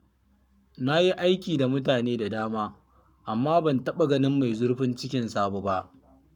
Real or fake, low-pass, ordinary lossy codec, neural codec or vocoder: fake; 19.8 kHz; none; codec, 44.1 kHz, 7.8 kbps, Pupu-Codec